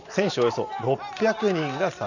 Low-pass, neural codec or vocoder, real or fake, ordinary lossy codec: 7.2 kHz; vocoder, 44.1 kHz, 128 mel bands every 512 samples, BigVGAN v2; fake; none